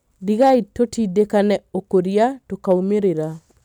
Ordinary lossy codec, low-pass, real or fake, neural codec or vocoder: none; 19.8 kHz; real; none